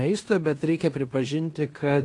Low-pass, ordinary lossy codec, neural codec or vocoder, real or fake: 10.8 kHz; AAC, 48 kbps; codec, 16 kHz in and 24 kHz out, 0.9 kbps, LongCat-Audio-Codec, fine tuned four codebook decoder; fake